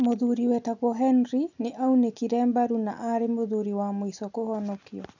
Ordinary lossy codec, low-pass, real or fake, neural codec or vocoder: none; 7.2 kHz; real; none